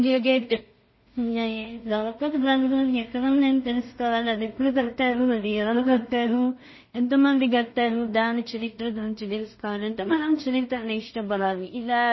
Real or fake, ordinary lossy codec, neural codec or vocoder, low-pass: fake; MP3, 24 kbps; codec, 16 kHz in and 24 kHz out, 0.4 kbps, LongCat-Audio-Codec, two codebook decoder; 7.2 kHz